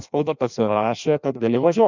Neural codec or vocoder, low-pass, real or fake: codec, 16 kHz in and 24 kHz out, 0.6 kbps, FireRedTTS-2 codec; 7.2 kHz; fake